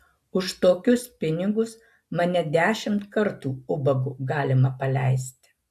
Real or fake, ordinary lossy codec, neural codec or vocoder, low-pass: real; AAC, 96 kbps; none; 14.4 kHz